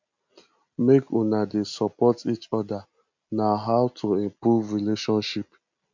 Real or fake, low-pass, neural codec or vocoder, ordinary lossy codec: real; 7.2 kHz; none; MP3, 64 kbps